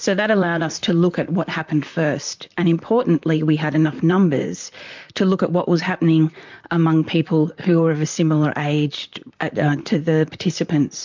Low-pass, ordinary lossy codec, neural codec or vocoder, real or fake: 7.2 kHz; MP3, 64 kbps; vocoder, 44.1 kHz, 128 mel bands, Pupu-Vocoder; fake